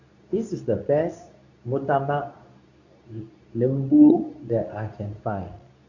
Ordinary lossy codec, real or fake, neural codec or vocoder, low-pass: none; fake; codec, 24 kHz, 0.9 kbps, WavTokenizer, medium speech release version 2; 7.2 kHz